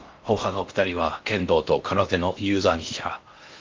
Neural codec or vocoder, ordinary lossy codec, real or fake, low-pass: codec, 16 kHz in and 24 kHz out, 0.6 kbps, FocalCodec, streaming, 4096 codes; Opus, 32 kbps; fake; 7.2 kHz